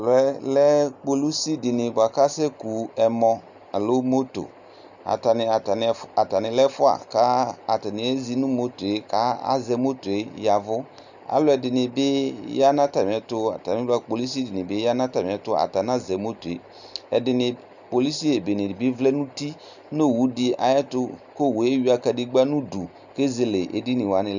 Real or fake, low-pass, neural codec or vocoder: real; 7.2 kHz; none